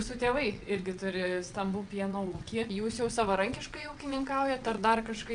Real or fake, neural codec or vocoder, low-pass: fake; vocoder, 22.05 kHz, 80 mel bands, WaveNeXt; 9.9 kHz